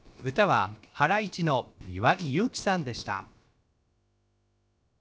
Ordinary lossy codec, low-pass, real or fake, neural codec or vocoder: none; none; fake; codec, 16 kHz, about 1 kbps, DyCAST, with the encoder's durations